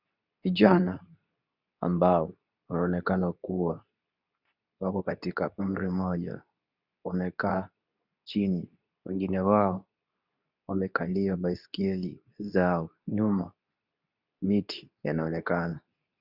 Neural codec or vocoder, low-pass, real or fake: codec, 24 kHz, 0.9 kbps, WavTokenizer, medium speech release version 2; 5.4 kHz; fake